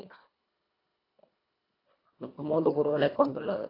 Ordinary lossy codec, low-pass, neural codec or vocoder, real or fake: AAC, 24 kbps; 5.4 kHz; codec, 24 kHz, 1.5 kbps, HILCodec; fake